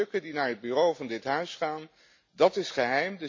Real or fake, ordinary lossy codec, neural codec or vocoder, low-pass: real; MP3, 48 kbps; none; 7.2 kHz